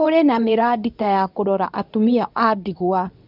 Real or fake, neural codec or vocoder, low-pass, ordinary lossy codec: fake; codec, 24 kHz, 0.9 kbps, WavTokenizer, medium speech release version 2; 5.4 kHz; none